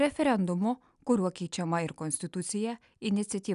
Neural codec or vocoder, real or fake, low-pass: none; real; 10.8 kHz